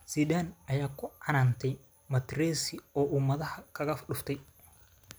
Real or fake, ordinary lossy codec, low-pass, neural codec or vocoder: real; none; none; none